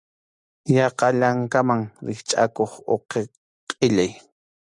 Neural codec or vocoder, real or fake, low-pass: none; real; 10.8 kHz